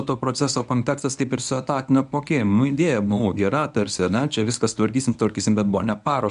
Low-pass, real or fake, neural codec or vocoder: 10.8 kHz; fake; codec, 24 kHz, 0.9 kbps, WavTokenizer, medium speech release version 1